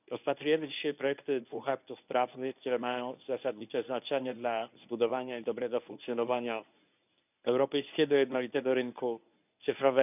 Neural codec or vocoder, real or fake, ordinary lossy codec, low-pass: codec, 24 kHz, 0.9 kbps, WavTokenizer, medium speech release version 1; fake; none; 3.6 kHz